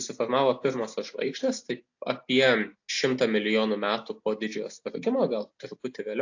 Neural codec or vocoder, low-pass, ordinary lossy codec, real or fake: none; 7.2 kHz; AAC, 48 kbps; real